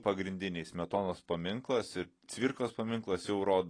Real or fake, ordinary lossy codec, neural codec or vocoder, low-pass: real; AAC, 32 kbps; none; 9.9 kHz